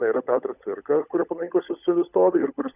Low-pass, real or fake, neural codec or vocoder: 3.6 kHz; fake; codec, 16 kHz, 16 kbps, FunCodec, trained on LibriTTS, 50 frames a second